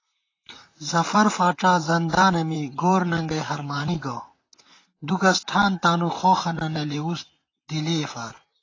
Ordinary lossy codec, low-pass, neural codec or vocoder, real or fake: AAC, 32 kbps; 7.2 kHz; vocoder, 44.1 kHz, 128 mel bands, Pupu-Vocoder; fake